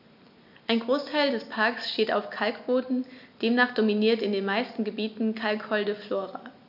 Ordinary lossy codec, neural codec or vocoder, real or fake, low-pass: none; none; real; 5.4 kHz